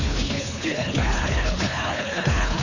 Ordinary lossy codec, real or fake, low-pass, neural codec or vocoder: none; fake; 7.2 kHz; codec, 24 kHz, 3 kbps, HILCodec